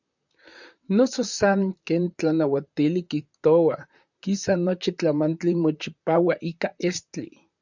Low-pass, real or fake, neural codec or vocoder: 7.2 kHz; fake; vocoder, 44.1 kHz, 128 mel bands, Pupu-Vocoder